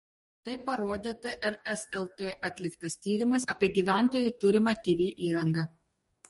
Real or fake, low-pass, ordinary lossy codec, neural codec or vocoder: fake; 19.8 kHz; MP3, 48 kbps; codec, 44.1 kHz, 2.6 kbps, DAC